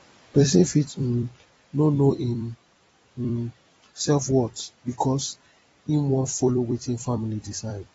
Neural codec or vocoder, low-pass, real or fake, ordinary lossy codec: vocoder, 44.1 kHz, 128 mel bands every 256 samples, BigVGAN v2; 19.8 kHz; fake; AAC, 24 kbps